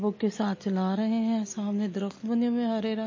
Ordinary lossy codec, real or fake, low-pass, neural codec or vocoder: MP3, 32 kbps; real; 7.2 kHz; none